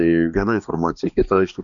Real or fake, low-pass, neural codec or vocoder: fake; 7.2 kHz; codec, 16 kHz, 2 kbps, X-Codec, HuBERT features, trained on balanced general audio